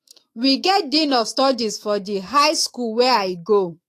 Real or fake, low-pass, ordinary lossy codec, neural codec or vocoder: fake; 14.4 kHz; AAC, 48 kbps; autoencoder, 48 kHz, 128 numbers a frame, DAC-VAE, trained on Japanese speech